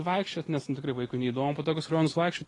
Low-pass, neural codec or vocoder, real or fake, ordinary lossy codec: 10.8 kHz; none; real; AAC, 32 kbps